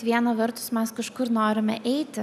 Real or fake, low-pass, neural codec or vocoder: real; 14.4 kHz; none